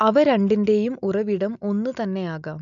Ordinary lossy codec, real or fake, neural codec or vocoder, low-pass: none; real; none; 7.2 kHz